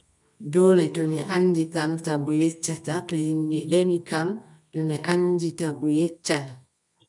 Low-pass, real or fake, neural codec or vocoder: 10.8 kHz; fake; codec, 24 kHz, 0.9 kbps, WavTokenizer, medium music audio release